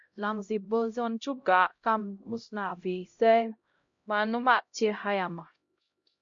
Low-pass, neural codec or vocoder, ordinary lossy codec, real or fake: 7.2 kHz; codec, 16 kHz, 0.5 kbps, X-Codec, HuBERT features, trained on LibriSpeech; MP3, 48 kbps; fake